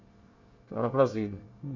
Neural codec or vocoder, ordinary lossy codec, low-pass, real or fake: codec, 24 kHz, 1 kbps, SNAC; Opus, 64 kbps; 7.2 kHz; fake